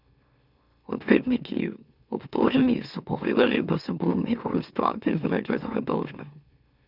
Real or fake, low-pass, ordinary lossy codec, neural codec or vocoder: fake; 5.4 kHz; none; autoencoder, 44.1 kHz, a latent of 192 numbers a frame, MeloTTS